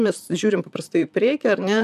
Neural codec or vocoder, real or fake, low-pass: vocoder, 44.1 kHz, 128 mel bands, Pupu-Vocoder; fake; 14.4 kHz